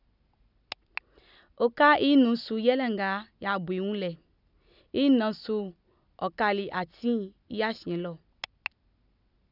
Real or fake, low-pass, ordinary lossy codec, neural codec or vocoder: real; 5.4 kHz; none; none